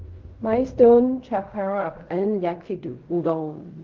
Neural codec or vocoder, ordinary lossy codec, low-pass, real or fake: codec, 16 kHz in and 24 kHz out, 0.4 kbps, LongCat-Audio-Codec, fine tuned four codebook decoder; Opus, 24 kbps; 7.2 kHz; fake